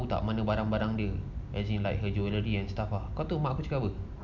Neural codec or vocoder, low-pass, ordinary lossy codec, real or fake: none; 7.2 kHz; none; real